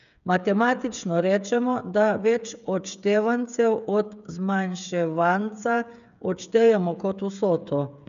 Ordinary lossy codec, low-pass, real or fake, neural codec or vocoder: none; 7.2 kHz; fake; codec, 16 kHz, 8 kbps, FreqCodec, smaller model